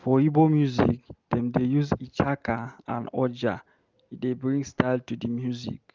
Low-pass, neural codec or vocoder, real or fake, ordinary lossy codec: 7.2 kHz; none; real; Opus, 24 kbps